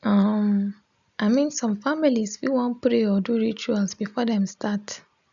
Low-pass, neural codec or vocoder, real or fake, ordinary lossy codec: 7.2 kHz; none; real; none